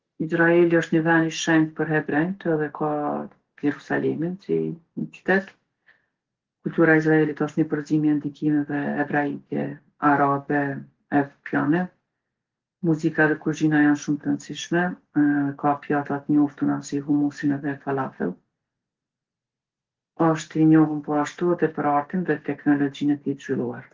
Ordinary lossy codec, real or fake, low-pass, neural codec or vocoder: Opus, 16 kbps; real; 7.2 kHz; none